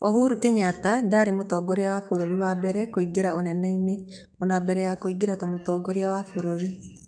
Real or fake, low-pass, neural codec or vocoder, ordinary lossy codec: fake; 9.9 kHz; codec, 32 kHz, 1.9 kbps, SNAC; none